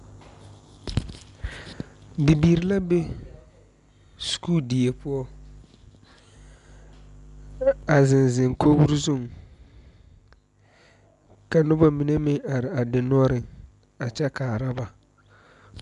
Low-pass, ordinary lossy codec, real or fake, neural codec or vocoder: 10.8 kHz; MP3, 96 kbps; real; none